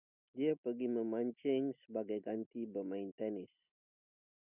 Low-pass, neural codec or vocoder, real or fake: 3.6 kHz; none; real